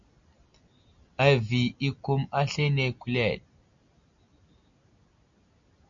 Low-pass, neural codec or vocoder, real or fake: 7.2 kHz; none; real